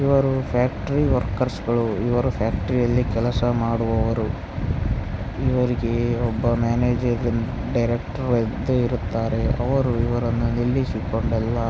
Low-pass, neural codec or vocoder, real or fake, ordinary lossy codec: none; none; real; none